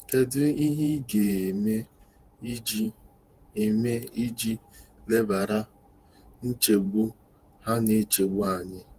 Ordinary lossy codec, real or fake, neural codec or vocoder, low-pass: Opus, 24 kbps; fake; vocoder, 48 kHz, 128 mel bands, Vocos; 14.4 kHz